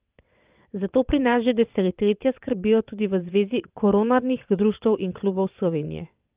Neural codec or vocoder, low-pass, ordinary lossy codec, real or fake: vocoder, 22.05 kHz, 80 mel bands, WaveNeXt; 3.6 kHz; Opus, 24 kbps; fake